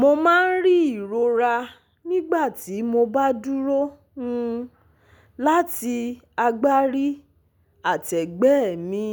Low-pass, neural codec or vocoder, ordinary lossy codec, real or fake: none; none; none; real